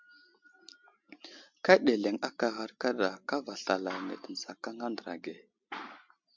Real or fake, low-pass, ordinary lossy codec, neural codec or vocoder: real; 7.2 kHz; MP3, 64 kbps; none